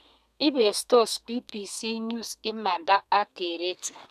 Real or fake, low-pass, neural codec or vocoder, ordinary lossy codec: fake; 14.4 kHz; codec, 32 kHz, 1.9 kbps, SNAC; none